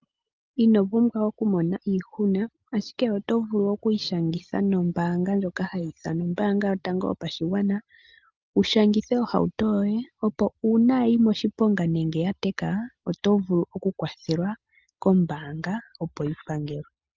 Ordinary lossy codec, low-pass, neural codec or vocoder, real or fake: Opus, 24 kbps; 7.2 kHz; none; real